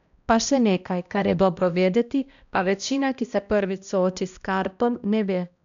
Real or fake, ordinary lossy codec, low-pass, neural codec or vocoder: fake; none; 7.2 kHz; codec, 16 kHz, 1 kbps, X-Codec, HuBERT features, trained on balanced general audio